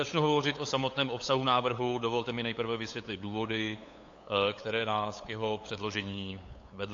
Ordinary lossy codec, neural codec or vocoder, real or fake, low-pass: AAC, 48 kbps; codec, 16 kHz, 8 kbps, FunCodec, trained on LibriTTS, 25 frames a second; fake; 7.2 kHz